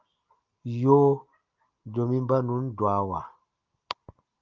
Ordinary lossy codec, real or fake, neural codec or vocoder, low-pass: Opus, 32 kbps; real; none; 7.2 kHz